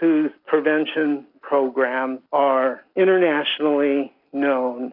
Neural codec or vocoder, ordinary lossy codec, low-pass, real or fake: none; AAC, 48 kbps; 5.4 kHz; real